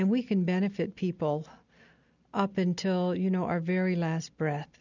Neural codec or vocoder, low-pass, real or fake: none; 7.2 kHz; real